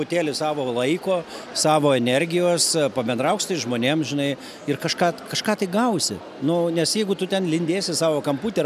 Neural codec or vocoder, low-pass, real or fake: none; 14.4 kHz; real